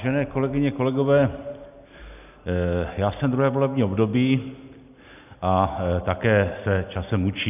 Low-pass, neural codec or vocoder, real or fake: 3.6 kHz; none; real